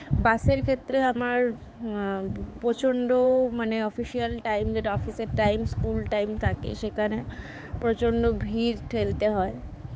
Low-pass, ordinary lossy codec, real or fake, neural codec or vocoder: none; none; fake; codec, 16 kHz, 4 kbps, X-Codec, HuBERT features, trained on balanced general audio